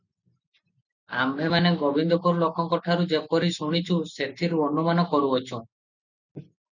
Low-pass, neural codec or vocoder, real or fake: 7.2 kHz; none; real